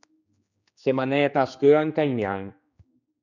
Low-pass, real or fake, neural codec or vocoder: 7.2 kHz; fake; codec, 16 kHz, 2 kbps, X-Codec, HuBERT features, trained on general audio